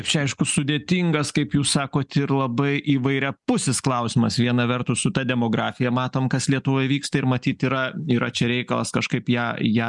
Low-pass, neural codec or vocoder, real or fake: 10.8 kHz; none; real